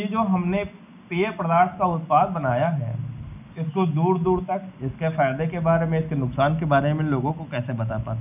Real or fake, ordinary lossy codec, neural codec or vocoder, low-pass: real; none; none; 3.6 kHz